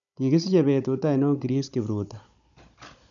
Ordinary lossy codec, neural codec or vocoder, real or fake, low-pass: none; codec, 16 kHz, 16 kbps, FunCodec, trained on Chinese and English, 50 frames a second; fake; 7.2 kHz